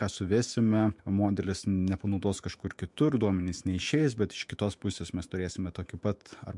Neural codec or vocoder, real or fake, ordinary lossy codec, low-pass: autoencoder, 48 kHz, 128 numbers a frame, DAC-VAE, trained on Japanese speech; fake; MP3, 64 kbps; 10.8 kHz